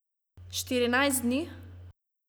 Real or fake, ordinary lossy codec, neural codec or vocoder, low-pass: real; none; none; none